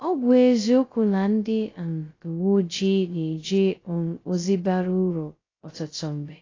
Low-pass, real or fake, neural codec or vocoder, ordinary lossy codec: 7.2 kHz; fake; codec, 16 kHz, 0.2 kbps, FocalCodec; AAC, 32 kbps